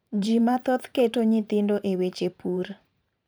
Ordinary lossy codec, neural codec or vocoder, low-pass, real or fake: none; vocoder, 44.1 kHz, 128 mel bands every 512 samples, BigVGAN v2; none; fake